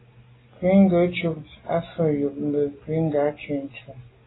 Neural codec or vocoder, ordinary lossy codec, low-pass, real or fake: none; AAC, 16 kbps; 7.2 kHz; real